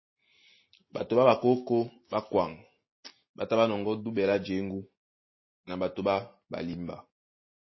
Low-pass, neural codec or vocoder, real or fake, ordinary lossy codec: 7.2 kHz; none; real; MP3, 24 kbps